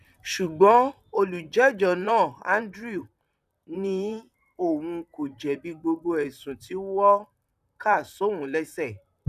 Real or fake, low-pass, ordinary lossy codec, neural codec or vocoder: fake; 14.4 kHz; none; vocoder, 44.1 kHz, 128 mel bands, Pupu-Vocoder